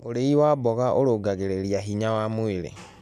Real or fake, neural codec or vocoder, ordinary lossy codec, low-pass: real; none; AAC, 96 kbps; 14.4 kHz